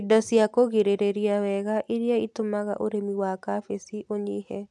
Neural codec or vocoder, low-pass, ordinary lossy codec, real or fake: none; none; none; real